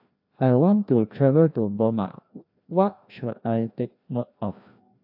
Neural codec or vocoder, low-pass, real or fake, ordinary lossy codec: codec, 16 kHz, 1 kbps, FreqCodec, larger model; 5.4 kHz; fake; AAC, 48 kbps